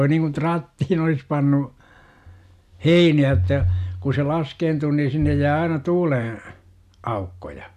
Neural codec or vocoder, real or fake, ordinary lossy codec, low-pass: none; real; MP3, 96 kbps; 14.4 kHz